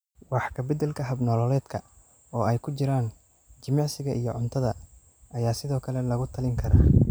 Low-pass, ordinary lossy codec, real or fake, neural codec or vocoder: none; none; real; none